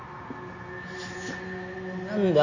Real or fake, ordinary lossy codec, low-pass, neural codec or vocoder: real; none; 7.2 kHz; none